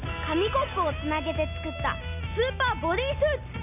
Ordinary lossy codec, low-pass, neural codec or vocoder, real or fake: none; 3.6 kHz; none; real